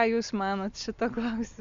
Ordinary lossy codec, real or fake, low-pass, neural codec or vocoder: Opus, 64 kbps; real; 7.2 kHz; none